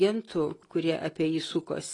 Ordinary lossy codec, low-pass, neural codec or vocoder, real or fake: AAC, 32 kbps; 10.8 kHz; none; real